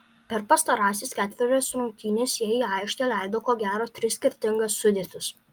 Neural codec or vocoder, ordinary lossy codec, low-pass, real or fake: none; Opus, 32 kbps; 19.8 kHz; real